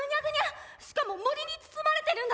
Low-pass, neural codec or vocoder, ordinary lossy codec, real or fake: none; none; none; real